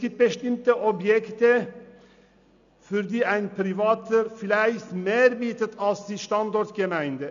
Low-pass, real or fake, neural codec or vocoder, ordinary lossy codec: 7.2 kHz; real; none; none